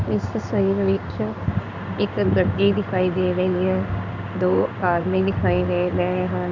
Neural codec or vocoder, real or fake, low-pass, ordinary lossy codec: codec, 16 kHz in and 24 kHz out, 1 kbps, XY-Tokenizer; fake; 7.2 kHz; none